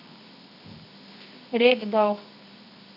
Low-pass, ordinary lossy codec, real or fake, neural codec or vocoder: 5.4 kHz; none; fake; codec, 24 kHz, 0.9 kbps, WavTokenizer, medium music audio release